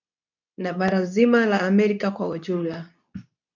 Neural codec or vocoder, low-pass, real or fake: codec, 24 kHz, 0.9 kbps, WavTokenizer, medium speech release version 2; 7.2 kHz; fake